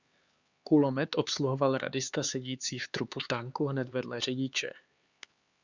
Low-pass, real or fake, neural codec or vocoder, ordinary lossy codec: 7.2 kHz; fake; codec, 16 kHz, 4 kbps, X-Codec, HuBERT features, trained on LibriSpeech; Opus, 64 kbps